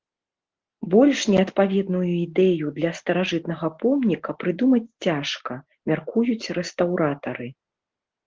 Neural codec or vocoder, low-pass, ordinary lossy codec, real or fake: none; 7.2 kHz; Opus, 16 kbps; real